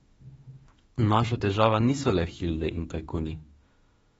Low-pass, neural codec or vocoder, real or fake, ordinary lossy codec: 19.8 kHz; autoencoder, 48 kHz, 32 numbers a frame, DAC-VAE, trained on Japanese speech; fake; AAC, 24 kbps